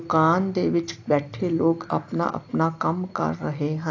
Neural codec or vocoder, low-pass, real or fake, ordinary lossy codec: none; 7.2 kHz; real; AAC, 48 kbps